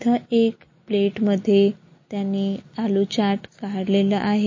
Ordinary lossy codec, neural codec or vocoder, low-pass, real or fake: MP3, 32 kbps; none; 7.2 kHz; real